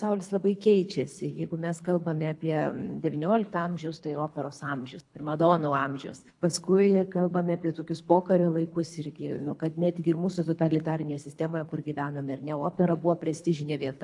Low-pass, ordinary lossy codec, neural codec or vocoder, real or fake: 10.8 kHz; AAC, 64 kbps; codec, 24 kHz, 3 kbps, HILCodec; fake